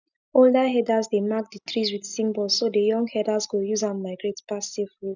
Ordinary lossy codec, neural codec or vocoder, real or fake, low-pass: none; none; real; 7.2 kHz